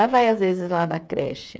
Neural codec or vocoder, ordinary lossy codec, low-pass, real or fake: codec, 16 kHz, 8 kbps, FreqCodec, smaller model; none; none; fake